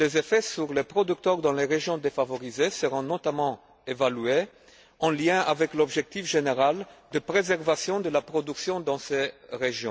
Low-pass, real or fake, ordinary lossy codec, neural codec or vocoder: none; real; none; none